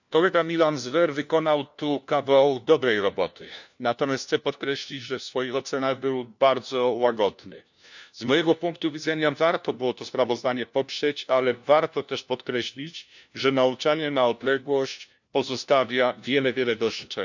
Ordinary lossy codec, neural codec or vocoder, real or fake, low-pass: none; codec, 16 kHz, 1 kbps, FunCodec, trained on LibriTTS, 50 frames a second; fake; 7.2 kHz